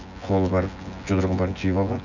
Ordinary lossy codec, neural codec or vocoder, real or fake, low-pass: none; vocoder, 24 kHz, 100 mel bands, Vocos; fake; 7.2 kHz